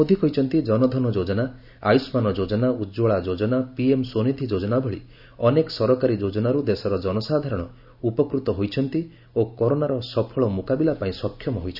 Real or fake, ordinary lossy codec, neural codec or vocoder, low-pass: real; none; none; 5.4 kHz